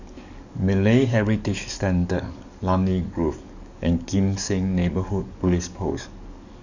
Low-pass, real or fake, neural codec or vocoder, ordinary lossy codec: 7.2 kHz; fake; codec, 44.1 kHz, 7.8 kbps, DAC; none